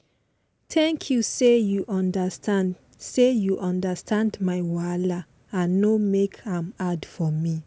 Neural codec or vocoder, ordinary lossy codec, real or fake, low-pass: none; none; real; none